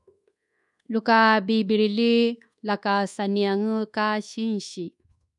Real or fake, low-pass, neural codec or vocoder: fake; 10.8 kHz; codec, 24 kHz, 1.2 kbps, DualCodec